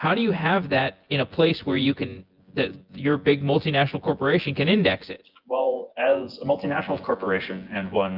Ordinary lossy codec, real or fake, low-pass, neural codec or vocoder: Opus, 32 kbps; fake; 5.4 kHz; vocoder, 24 kHz, 100 mel bands, Vocos